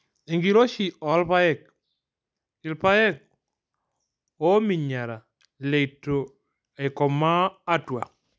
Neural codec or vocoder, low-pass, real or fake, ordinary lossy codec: none; none; real; none